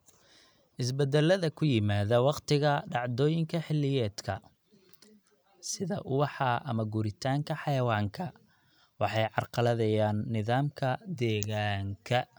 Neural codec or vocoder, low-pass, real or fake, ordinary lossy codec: none; none; real; none